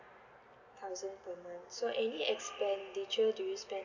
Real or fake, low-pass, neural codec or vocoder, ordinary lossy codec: real; 7.2 kHz; none; none